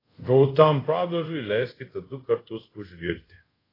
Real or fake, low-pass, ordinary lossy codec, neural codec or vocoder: fake; 5.4 kHz; AAC, 24 kbps; codec, 24 kHz, 0.5 kbps, DualCodec